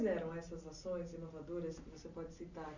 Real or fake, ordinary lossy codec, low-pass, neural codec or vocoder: real; none; 7.2 kHz; none